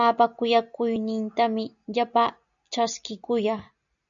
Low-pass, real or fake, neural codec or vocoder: 7.2 kHz; real; none